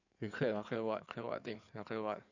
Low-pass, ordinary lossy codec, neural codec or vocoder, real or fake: 7.2 kHz; none; codec, 16 kHz in and 24 kHz out, 2.2 kbps, FireRedTTS-2 codec; fake